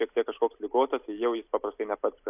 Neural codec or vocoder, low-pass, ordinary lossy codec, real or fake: none; 3.6 kHz; AAC, 32 kbps; real